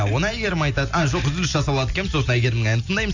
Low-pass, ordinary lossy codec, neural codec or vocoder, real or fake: 7.2 kHz; none; none; real